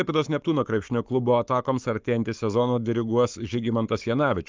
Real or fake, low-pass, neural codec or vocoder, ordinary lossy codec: fake; 7.2 kHz; codec, 44.1 kHz, 7.8 kbps, Pupu-Codec; Opus, 24 kbps